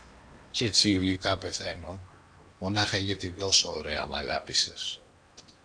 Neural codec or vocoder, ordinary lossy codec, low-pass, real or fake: codec, 16 kHz in and 24 kHz out, 0.8 kbps, FocalCodec, streaming, 65536 codes; AAC, 64 kbps; 9.9 kHz; fake